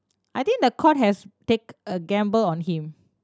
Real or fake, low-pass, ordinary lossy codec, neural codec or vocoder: real; none; none; none